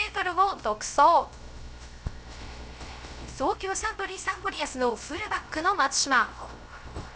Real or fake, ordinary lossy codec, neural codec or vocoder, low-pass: fake; none; codec, 16 kHz, 0.3 kbps, FocalCodec; none